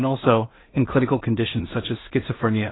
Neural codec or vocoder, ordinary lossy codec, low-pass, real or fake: codec, 16 kHz in and 24 kHz out, 0.4 kbps, LongCat-Audio-Codec, two codebook decoder; AAC, 16 kbps; 7.2 kHz; fake